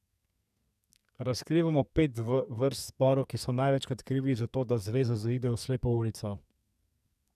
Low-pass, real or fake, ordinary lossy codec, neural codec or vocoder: 14.4 kHz; fake; none; codec, 44.1 kHz, 2.6 kbps, SNAC